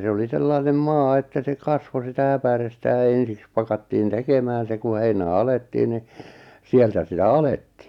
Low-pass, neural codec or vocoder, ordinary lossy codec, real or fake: 19.8 kHz; none; none; real